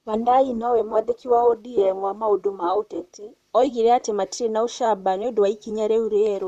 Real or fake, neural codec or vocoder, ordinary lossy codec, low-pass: fake; vocoder, 44.1 kHz, 128 mel bands, Pupu-Vocoder; none; 14.4 kHz